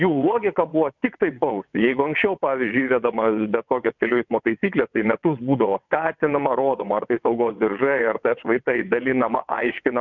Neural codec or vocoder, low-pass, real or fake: vocoder, 22.05 kHz, 80 mel bands, WaveNeXt; 7.2 kHz; fake